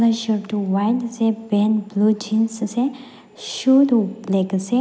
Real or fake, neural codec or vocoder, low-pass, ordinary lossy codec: real; none; none; none